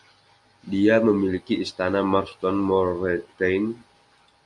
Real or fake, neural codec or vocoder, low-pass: real; none; 10.8 kHz